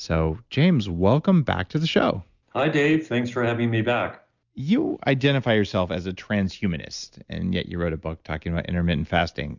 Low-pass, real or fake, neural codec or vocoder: 7.2 kHz; real; none